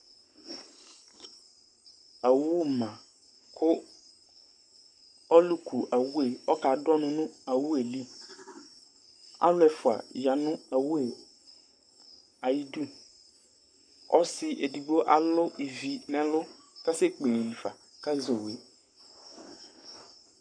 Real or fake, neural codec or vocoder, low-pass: fake; codec, 44.1 kHz, 7.8 kbps, Pupu-Codec; 9.9 kHz